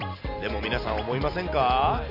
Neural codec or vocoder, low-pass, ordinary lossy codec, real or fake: none; 5.4 kHz; none; real